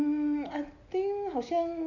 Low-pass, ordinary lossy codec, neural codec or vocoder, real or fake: 7.2 kHz; none; none; real